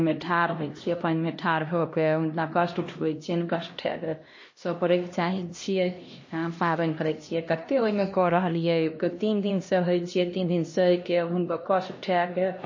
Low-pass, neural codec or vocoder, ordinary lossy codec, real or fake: 7.2 kHz; codec, 16 kHz, 1 kbps, X-Codec, HuBERT features, trained on LibriSpeech; MP3, 32 kbps; fake